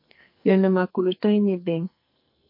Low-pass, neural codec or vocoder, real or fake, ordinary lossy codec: 5.4 kHz; codec, 44.1 kHz, 2.6 kbps, SNAC; fake; MP3, 32 kbps